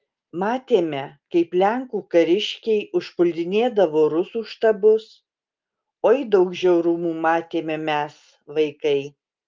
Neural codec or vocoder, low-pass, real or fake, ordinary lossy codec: none; 7.2 kHz; real; Opus, 24 kbps